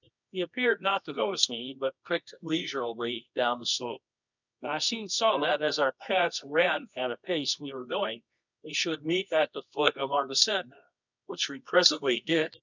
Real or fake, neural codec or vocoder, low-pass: fake; codec, 24 kHz, 0.9 kbps, WavTokenizer, medium music audio release; 7.2 kHz